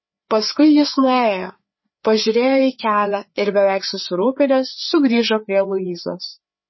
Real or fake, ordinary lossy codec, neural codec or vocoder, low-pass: fake; MP3, 24 kbps; codec, 16 kHz, 4 kbps, FreqCodec, larger model; 7.2 kHz